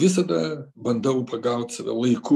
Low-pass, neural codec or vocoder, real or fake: 14.4 kHz; none; real